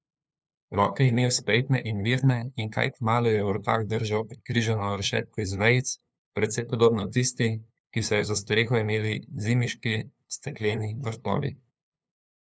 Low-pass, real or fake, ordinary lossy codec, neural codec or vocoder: none; fake; none; codec, 16 kHz, 2 kbps, FunCodec, trained on LibriTTS, 25 frames a second